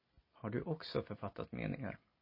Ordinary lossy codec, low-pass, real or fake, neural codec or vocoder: MP3, 24 kbps; 5.4 kHz; real; none